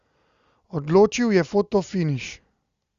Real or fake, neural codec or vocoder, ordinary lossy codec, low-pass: real; none; Opus, 64 kbps; 7.2 kHz